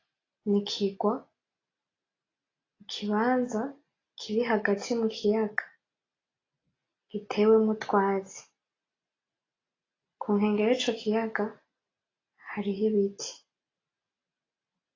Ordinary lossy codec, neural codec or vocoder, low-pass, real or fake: AAC, 32 kbps; none; 7.2 kHz; real